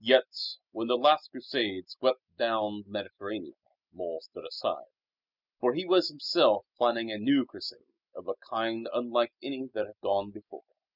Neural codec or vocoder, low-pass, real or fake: none; 5.4 kHz; real